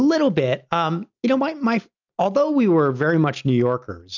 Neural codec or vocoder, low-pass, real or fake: none; 7.2 kHz; real